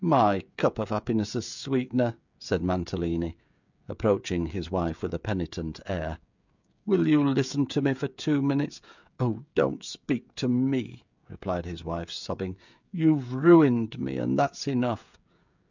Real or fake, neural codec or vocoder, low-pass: fake; codec, 16 kHz, 16 kbps, FreqCodec, smaller model; 7.2 kHz